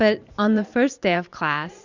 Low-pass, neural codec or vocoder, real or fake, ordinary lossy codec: 7.2 kHz; vocoder, 44.1 kHz, 128 mel bands every 512 samples, BigVGAN v2; fake; Opus, 64 kbps